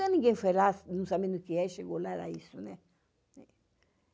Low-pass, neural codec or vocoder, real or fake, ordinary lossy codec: none; none; real; none